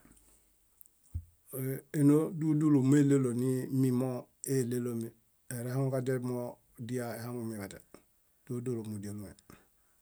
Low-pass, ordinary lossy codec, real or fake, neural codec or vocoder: none; none; real; none